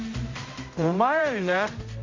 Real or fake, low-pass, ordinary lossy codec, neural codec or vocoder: fake; 7.2 kHz; MP3, 48 kbps; codec, 16 kHz, 0.5 kbps, X-Codec, HuBERT features, trained on general audio